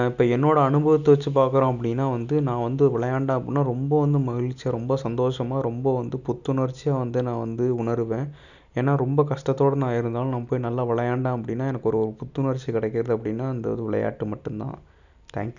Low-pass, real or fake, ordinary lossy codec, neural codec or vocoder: 7.2 kHz; real; none; none